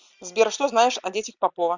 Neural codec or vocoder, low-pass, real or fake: none; 7.2 kHz; real